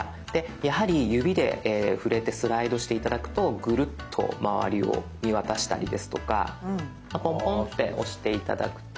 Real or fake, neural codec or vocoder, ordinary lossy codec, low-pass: real; none; none; none